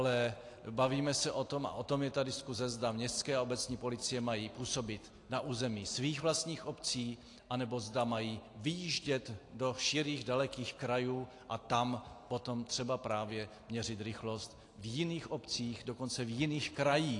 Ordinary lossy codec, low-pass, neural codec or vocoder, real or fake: AAC, 48 kbps; 10.8 kHz; none; real